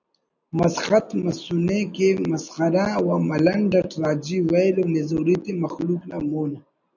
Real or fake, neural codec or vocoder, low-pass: real; none; 7.2 kHz